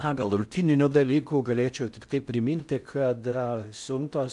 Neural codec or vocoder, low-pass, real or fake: codec, 16 kHz in and 24 kHz out, 0.6 kbps, FocalCodec, streaming, 4096 codes; 10.8 kHz; fake